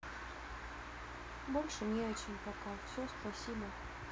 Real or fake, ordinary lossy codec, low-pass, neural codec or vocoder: real; none; none; none